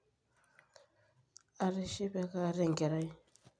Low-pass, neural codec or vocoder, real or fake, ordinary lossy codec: 9.9 kHz; none; real; none